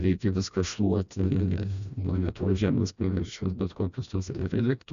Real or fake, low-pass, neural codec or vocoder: fake; 7.2 kHz; codec, 16 kHz, 1 kbps, FreqCodec, smaller model